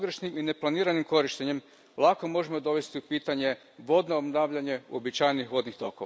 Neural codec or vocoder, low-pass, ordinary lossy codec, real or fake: none; none; none; real